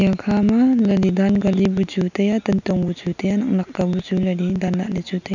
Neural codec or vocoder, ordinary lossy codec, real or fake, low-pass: none; none; real; 7.2 kHz